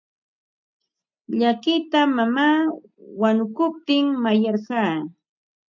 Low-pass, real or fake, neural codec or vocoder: 7.2 kHz; real; none